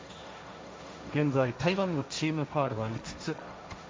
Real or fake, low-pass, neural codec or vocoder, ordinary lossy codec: fake; none; codec, 16 kHz, 1.1 kbps, Voila-Tokenizer; none